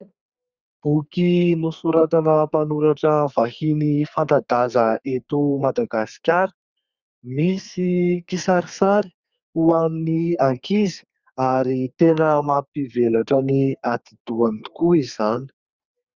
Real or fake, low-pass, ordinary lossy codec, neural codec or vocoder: fake; 7.2 kHz; Opus, 64 kbps; codec, 32 kHz, 1.9 kbps, SNAC